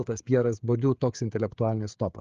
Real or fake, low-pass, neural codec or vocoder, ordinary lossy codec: fake; 7.2 kHz; codec, 16 kHz, 16 kbps, FreqCodec, smaller model; Opus, 32 kbps